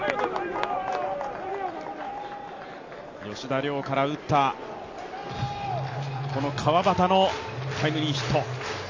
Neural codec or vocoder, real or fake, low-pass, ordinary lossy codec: none; real; 7.2 kHz; AAC, 48 kbps